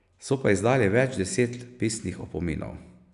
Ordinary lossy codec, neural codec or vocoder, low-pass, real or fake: none; none; 10.8 kHz; real